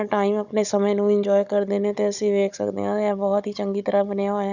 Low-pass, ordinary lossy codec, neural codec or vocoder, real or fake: 7.2 kHz; none; codec, 16 kHz, 16 kbps, FunCodec, trained on Chinese and English, 50 frames a second; fake